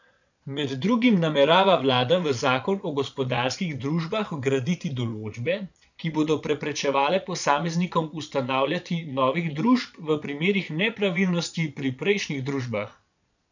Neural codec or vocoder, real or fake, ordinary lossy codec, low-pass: vocoder, 22.05 kHz, 80 mel bands, Vocos; fake; none; 7.2 kHz